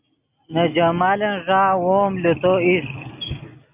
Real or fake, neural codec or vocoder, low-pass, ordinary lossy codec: real; none; 3.6 kHz; Opus, 64 kbps